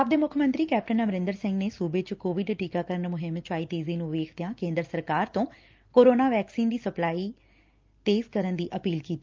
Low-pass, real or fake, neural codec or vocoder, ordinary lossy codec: 7.2 kHz; real; none; Opus, 24 kbps